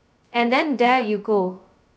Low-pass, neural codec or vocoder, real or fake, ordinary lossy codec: none; codec, 16 kHz, 0.2 kbps, FocalCodec; fake; none